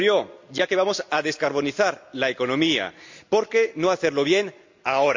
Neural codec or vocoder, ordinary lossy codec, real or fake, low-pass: none; MP3, 48 kbps; real; 7.2 kHz